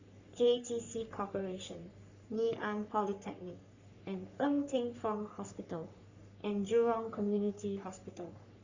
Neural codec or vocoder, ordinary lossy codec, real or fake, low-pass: codec, 44.1 kHz, 3.4 kbps, Pupu-Codec; Opus, 64 kbps; fake; 7.2 kHz